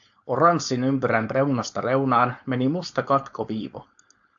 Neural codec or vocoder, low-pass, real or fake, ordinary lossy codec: codec, 16 kHz, 4.8 kbps, FACodec; 7.2 kHz; fake; AAC, 64 kbps